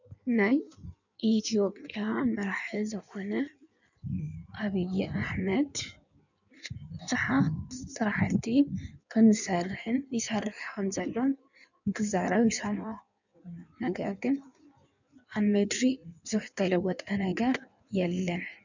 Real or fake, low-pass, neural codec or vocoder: fake; 7.2 kHz; codec, 16 kHz in and 24 kHz out, 1.1 kbps, FireRedTTS-2 codec